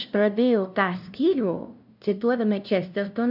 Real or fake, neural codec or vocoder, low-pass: fake; codec, 16 kHz, 0.5 kbps, FunCodec, trained on LibriTTS, 25 frames a second; 5.4 kHz